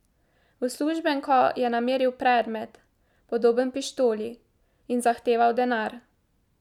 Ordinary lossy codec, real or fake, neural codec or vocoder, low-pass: none; real; none; 19.8 kHz